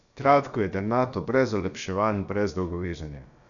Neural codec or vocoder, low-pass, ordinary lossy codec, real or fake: codec, 16 kHz, about 1 kbps, DyCAST, with the encoder's durations; 7.2 kHz; none; fake